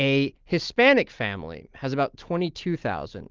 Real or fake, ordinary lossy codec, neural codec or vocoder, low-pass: real; Opus, 32 kbps; none; 7.2 kHz